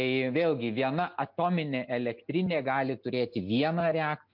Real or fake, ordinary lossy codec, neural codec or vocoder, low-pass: real; MP3, 48 kbps; none; 5.4 kHz